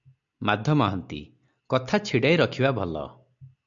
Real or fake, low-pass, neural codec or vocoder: real; 7.2 kHz; none